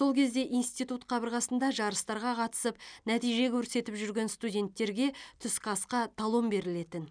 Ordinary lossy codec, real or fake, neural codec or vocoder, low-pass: none; real; none; 9.9 kHz